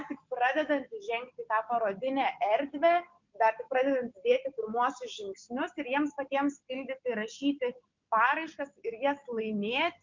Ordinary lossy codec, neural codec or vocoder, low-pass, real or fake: MP3, 64 kbps; none; 7.2 kHz; real